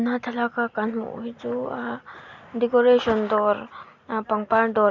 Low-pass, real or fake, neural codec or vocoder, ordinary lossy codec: 7.2 kHz; real; none; Opus, 64 kbps